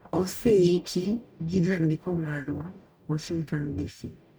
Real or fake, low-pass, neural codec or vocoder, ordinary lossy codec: fake; none; codec, 44.1 kHz, 0.9 kbps, DAC; none